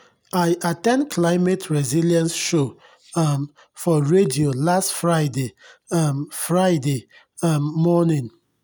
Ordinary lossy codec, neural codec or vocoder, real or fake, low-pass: none; none; real; none